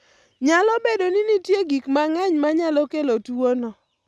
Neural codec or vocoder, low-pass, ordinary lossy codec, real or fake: none; none; none; real